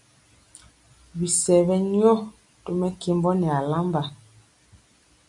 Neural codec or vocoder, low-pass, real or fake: none; 10.8 kHz; real